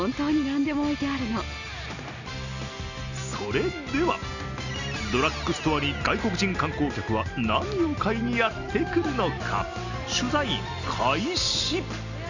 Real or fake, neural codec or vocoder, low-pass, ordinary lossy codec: real; none; 7.2 kHz; none